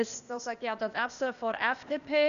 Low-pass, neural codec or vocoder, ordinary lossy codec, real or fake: 7.2 kHz; codec, 16 kHz, 0.8 kbps, ZipCodec; none; fake